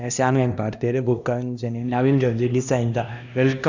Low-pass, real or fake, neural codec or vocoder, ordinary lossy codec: 7.2 kHz; fake; codec, 16 kHz, 1 kbps, X-Codec, HuBERT features, trained on LibriSpeech; none